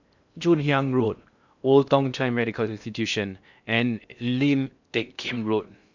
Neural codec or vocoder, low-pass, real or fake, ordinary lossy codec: codec, 16 kHz in and 24 kHz out, 0.6 kbps, FocalCodec, streaming, 2048 codes; 7.2 kHz; fake; none